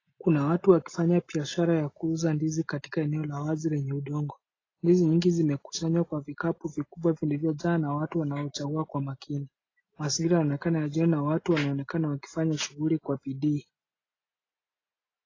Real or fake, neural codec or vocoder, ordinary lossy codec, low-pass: real; none; AAC, 32 kbps; 7.2 kHz